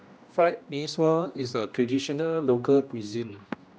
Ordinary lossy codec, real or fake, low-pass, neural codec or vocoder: none; fake; none; codec, 16 kHz, 1 kbps, X-Codec, HuBERT features, trained on general audio